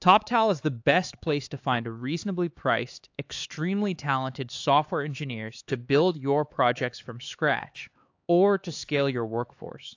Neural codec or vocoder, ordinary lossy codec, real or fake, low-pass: codec, 16 kHz, 4 kbps, X-Codec, HuBERT features, trained on LibriSpeech; AAC, 48 kbps; fake; 7.2 kHz